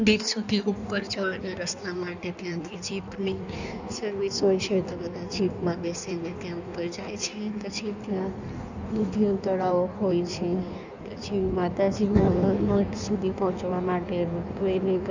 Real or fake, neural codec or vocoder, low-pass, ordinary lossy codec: fake; codec, 16 kHz in and 24 kHz out, 1.1 kbps, FireRedTTS-2 codec; 7.2 kHz; none